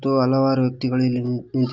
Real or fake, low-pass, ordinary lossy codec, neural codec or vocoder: real; 7.2 kHz; Opus, 24 kbps; none